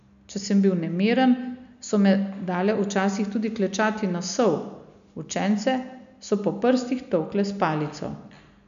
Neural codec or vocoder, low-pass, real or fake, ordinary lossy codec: none; 7.2 kHz; real; none